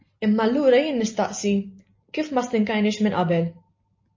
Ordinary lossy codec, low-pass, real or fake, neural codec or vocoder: MP3, 32 kbps; 7.2 kHz; real; none